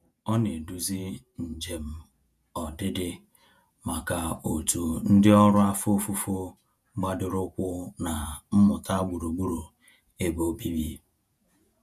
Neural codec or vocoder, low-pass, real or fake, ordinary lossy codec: vocoder, 48 kHz, 128 mel bands, Vocos; 14.4 kHz; fake; none